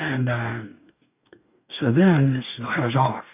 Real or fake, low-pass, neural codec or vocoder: fake; 3.6 kHz; codec, 44.1 kHz, 2.6 kbps, DAC